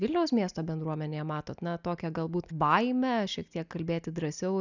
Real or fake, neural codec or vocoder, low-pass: real; none; 7.2 kHz